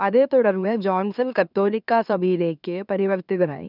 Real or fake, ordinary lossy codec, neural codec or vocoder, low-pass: fake; none; autoencoder, 44.1 kHz, a latent of 192 numbers a frame, MeloTTS; 5.4 kHz